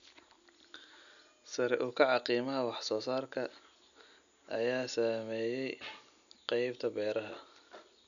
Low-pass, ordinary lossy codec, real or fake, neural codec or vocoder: 7.2 kHz; none; real; none